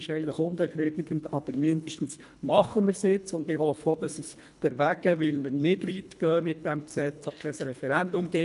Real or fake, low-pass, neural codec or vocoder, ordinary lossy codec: fake; 10.8 kHz; codec, 24 kHz, 1.5 kbps, HILCodec; none